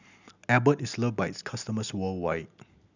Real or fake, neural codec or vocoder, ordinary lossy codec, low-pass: real; none; none; 7.2 kHz